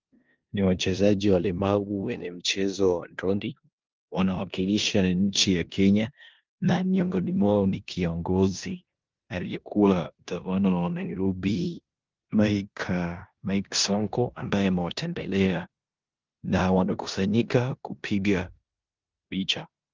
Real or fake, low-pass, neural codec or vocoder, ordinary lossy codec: fake; 7.2 kHz; codec, 16 kHz in and 24 kHz out, 0.9 kbps, LongCat-Audio-Codec, four codebook decoder; Opus, 32 kbps